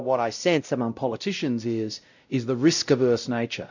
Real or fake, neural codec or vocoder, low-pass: fake; codec, 16 kHz, 0.5 kbps, X-Codec, WavLM features, trained on Multilingual LibriSpeech; 7.2 kHz